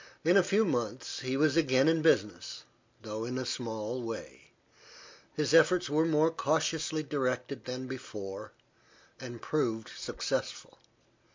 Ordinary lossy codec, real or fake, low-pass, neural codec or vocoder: MP3, 64 kbps; real; 7.2 kHz; none